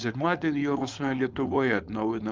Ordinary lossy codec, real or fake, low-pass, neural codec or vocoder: Opus, 24 kbps; fake; 7.2 kHz; codec, 16 kHz, 4.8 kbps, FACodec